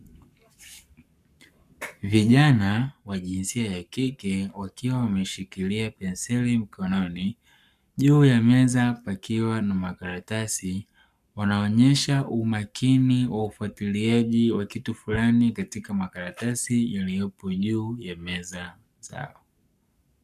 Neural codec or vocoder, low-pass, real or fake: codec, 44.1 kHz, 7.8 kbps, Pupu-Codec; 14.4 kHz; fake